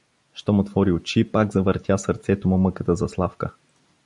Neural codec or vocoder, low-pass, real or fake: none; 10.8 kHz; real